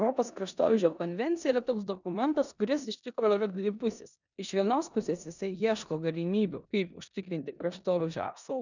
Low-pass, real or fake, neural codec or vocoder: 7.2 kHz; fake; codec, 16 kHz in and 24 kHz out, 0.9 kbps, LongCat-Audio-Codec, four codebook decoder